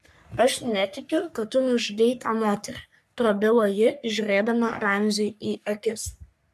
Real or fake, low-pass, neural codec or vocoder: fake; 14.4 kHz; codec, 44.1 kHz, 3.4 kbps, Pupu-Codec